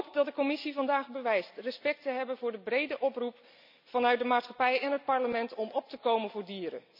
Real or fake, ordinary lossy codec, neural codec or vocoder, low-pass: real; none; none; 5.4 kHz